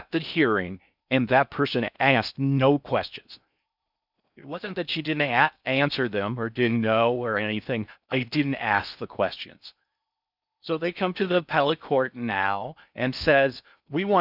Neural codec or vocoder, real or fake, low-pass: codec, 16 kHz in and 24 kHz out, 0.6 kbps, FocalCodec, streaming, 4096 codes; fake; 5.4 kHz